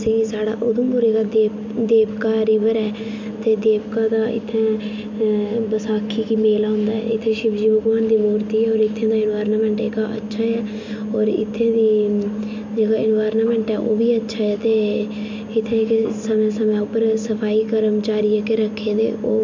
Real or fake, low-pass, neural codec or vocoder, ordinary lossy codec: real; 7.2 kHz; none; MP3, 48 kbps